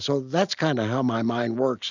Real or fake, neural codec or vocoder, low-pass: real; none; 7.2 kHz